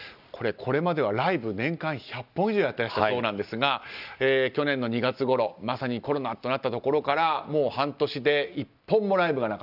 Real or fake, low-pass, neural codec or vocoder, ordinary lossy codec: real; 5.4 kHz; none; none